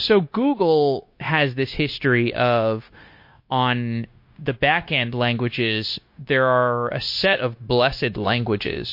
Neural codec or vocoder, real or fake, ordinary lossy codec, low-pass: codec, 16 kHz, 0.9 kbps, LongCat-Audio-Codec; fake; MP3, 32 kbps; 5.4 kHz